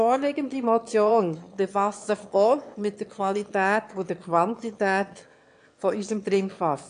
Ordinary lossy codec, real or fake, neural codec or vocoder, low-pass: AAC, 64 kbps; fake; autoencoder, 22.05 kHz, a latent of 192 numbers a frame, VITS, trained on one speaker; 9.9 kHz